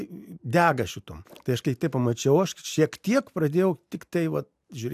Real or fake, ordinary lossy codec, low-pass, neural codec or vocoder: real; AAC, 96 kbps; 14.4 kHz; none